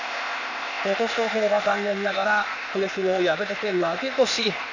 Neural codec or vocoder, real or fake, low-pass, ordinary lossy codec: codec, 16 kHz, 0.8 kbps, ZipCodec; fake; 7.2 kHz; none